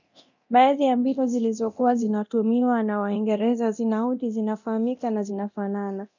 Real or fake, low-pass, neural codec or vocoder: fake; 7.2 kHz; codec, 24 kHz, 0.9 kbps, DualCodec